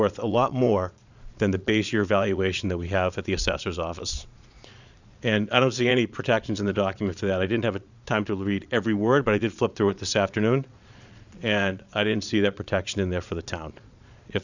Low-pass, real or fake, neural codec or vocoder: 7.2 kHz; fake; vocoder, 22.05 kHz, 80 mel bands, Vocos